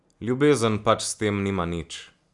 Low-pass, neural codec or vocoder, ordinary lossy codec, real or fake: 10.8 kHz; none; none; real